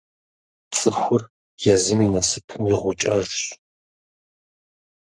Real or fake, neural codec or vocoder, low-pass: fake; codec, 44.1 kHz, 3.4 kbps, Pupu-Codec; 9.9 kHz